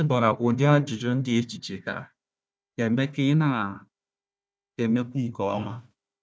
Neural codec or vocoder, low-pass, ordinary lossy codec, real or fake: codec, 16 kHz, 1 kbps, FunCodec, trained on Chinese and English, 50 frames a second; none; none; fake